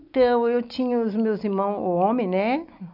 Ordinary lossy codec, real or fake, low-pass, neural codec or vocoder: none; real; 5.4 kHz; none